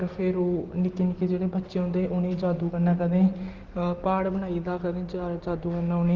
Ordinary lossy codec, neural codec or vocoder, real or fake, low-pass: Opus, 16 kbps; none; real; 7.2 kHz